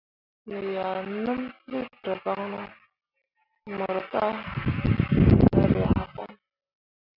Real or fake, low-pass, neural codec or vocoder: real; 5.4 kHz; none